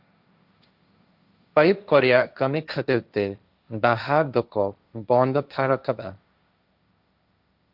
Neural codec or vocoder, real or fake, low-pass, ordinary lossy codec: codec, 16 kHz, 1.1 kbps, Voila-Tokenizer; fake; 5.4 kHz; Opus, 64 kbps